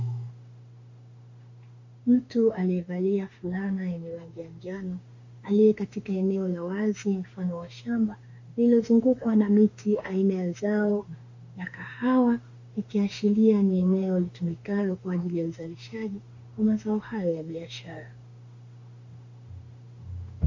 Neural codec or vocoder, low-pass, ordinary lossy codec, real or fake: autoencoder, 48 kHz, 32 numbers a frame, DAC-VAE, trained on Japanese speech; 7.2 kHz; MP3, 48 kbps; fake